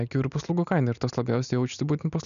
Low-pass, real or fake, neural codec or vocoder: 7.2 kHz; real; none